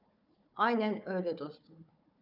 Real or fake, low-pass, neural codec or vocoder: fake; 5.4 kHz; codec, 16 kHz, 4 kbps, FunCodec, trained on Chinese and English, 50 frames a second